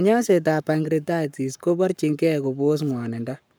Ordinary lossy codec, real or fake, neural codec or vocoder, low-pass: none; fake; codec, 44.1 kHz, 7.8 kbps, Pupu-Codec; none